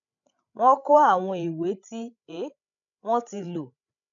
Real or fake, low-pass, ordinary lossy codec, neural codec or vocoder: fake; 7.2 kHz; none; codec, 16 kHz, 16 kbps, FreqCodec, larger model